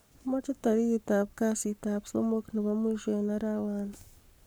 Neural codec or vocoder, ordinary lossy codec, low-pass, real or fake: codec, 44.1 kHz, 7.8 kbps, Pupu-Codec; none; none; fake